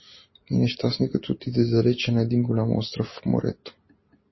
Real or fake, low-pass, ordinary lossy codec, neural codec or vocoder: real; 7.2 kHz; MP3, 24 kbps; none